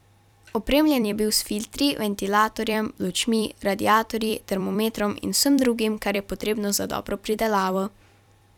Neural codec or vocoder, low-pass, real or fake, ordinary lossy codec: vocoder, 44.1 kHz, 128 mel bands every 256 samples, BigVGAN v2; 19.8 kHz; fake; none